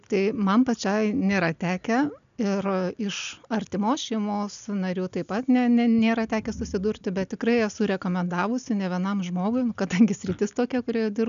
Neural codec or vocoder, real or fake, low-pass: none; real; 7.2 kHz